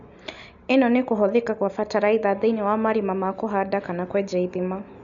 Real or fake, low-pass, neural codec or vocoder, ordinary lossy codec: real; 7.2 kHz; none; none